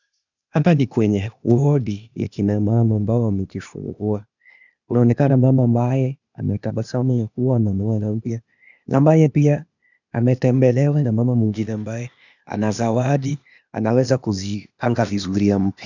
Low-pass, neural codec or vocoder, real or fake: 7.2 kHz; codec, 16 kHz, 0.8 kbps, ZipCodec; fake